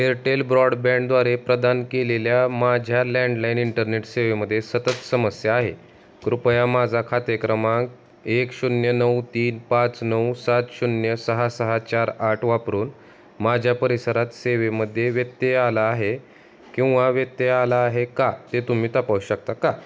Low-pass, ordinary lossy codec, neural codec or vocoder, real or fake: none; none; none; real